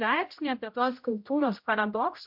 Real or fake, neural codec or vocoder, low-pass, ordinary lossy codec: fake; codec, 16 kHz, 0.5 kbps, X-Codec, HuBERT features, trained on general audio; 5.4 kHz; MP3, 48 kbps